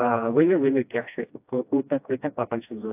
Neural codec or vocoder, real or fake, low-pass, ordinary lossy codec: codec, 16 kHz, 1 kbps, FreqCodec, smaller model; fake; 3.6 kHz; AAC, 32 kbps